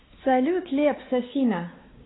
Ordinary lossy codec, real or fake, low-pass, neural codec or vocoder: AAC, 16 kbps; fake; 7.2 kHz; codec, 16 kHz, 4 kbps, X-Codec, WavLM features, trained on Multilingual LibriSpeech